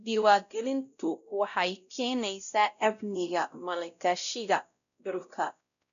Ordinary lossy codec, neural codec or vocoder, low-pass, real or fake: none; codec, 16 kHz, 0.5 kbps, X-Codec, WavLM features, trained on Multilingual LibriSpeech; 7.2 kHz; fake